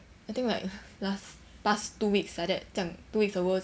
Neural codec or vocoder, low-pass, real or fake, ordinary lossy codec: none; none; real; none